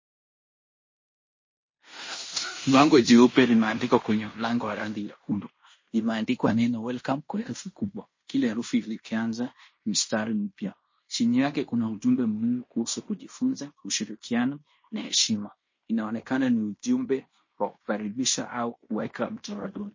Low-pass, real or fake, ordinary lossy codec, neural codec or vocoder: 7.2 kHz; fake; MP3, 32 kbps; codec, 16 kHz in and 24 kHz out, 0.9 kbps, LongCat-Audio-Codec, fine tuned four codebook decoder